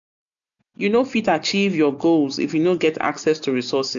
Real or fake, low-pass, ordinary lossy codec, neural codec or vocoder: real; 7.2 kHz; none; none